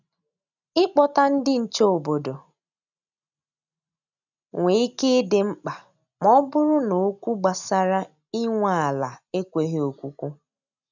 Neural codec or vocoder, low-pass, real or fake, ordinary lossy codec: none; 7.2 kHz; real; none